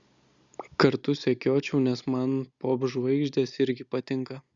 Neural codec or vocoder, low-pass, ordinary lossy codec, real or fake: none; 7.2 kHz; Opus, 64 kbps; real